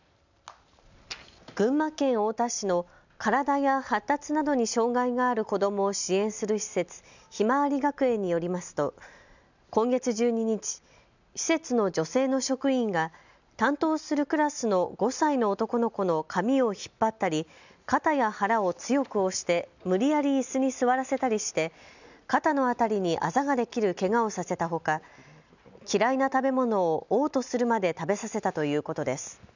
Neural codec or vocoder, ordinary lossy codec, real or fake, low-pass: none; none; real; 7.2 kHz